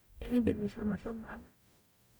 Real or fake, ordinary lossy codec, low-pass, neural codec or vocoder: fake; none; none; codec, 44.1 kHz, 0.9 kbps, DAC